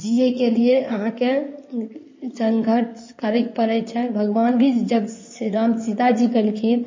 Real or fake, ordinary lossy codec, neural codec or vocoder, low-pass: fake; MP3, 32 kbps; codec, 16 kHz in and 24 kHz out, 2.2 kbps, FireRedTTS-2 codec; 7.2 kHz